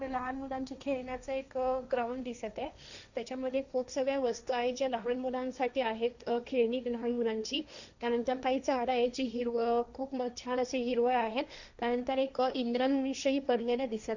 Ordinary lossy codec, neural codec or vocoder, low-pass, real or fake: none; codec, 16 kHz, 1.1 kbps, Voila-Tokenizer; 7.2 kHz; fake